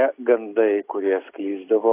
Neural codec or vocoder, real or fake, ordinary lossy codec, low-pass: none; real; AAC, 16 kbps; 3.6 kHz